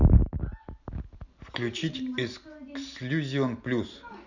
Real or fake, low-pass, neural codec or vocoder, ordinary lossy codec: real; 7.2 kHz; none; none